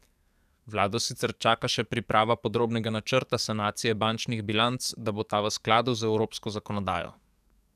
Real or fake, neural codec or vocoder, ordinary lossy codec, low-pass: fake; codec, 44.1 kHz, 7.8 kbps, DAC; none; 14.4 kHz